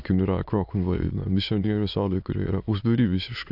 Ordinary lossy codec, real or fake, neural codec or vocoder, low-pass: AAC, 48 kbps; fake; autoencoder, 22.05 kHz, a latent of 192 numbers a frame, VITS, trained on many speakers; 5.4 kHz